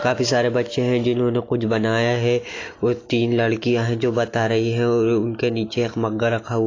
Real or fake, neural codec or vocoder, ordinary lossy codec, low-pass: real; none; AAC, 32 kbps; 7.2 kHz